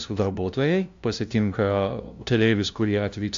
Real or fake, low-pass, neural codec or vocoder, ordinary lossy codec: fake; 7.2 kHz; codec, 16 kHz, 0.5 kbps, FunCodec, trained on LibriTTS, 25 frames a second; AAC, 64 kbps